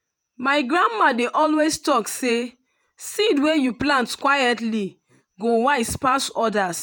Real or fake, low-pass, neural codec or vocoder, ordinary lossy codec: fake; none; vocoder, 48 kHz, 128 mel bands, Vocos; none